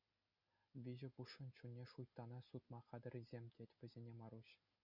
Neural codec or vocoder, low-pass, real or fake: none; 5.4 kHz; real